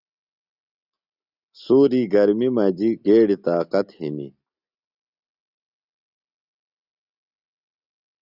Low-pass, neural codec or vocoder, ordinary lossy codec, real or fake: 5.4 kHz; none; Opus, 64 kbps; real